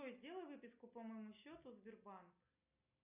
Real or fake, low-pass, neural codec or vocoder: real; 3.6 kHz; none